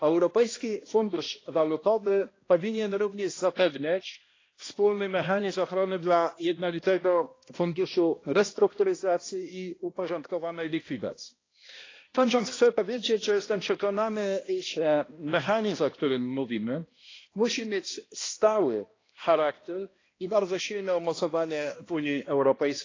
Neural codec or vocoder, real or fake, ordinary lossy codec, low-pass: codec, 16 kHz, 1 kbps, X-Codec, HuBERT features, trained on balanced general audio; fake; AAC, 32 kbps; 7.2 kHz